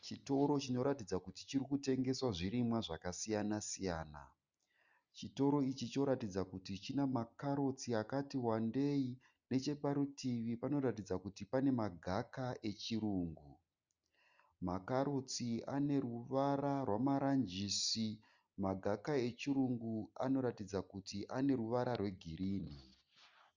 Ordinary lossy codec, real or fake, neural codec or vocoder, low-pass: Opus, 64 kbps; real; none; 7.2 kHz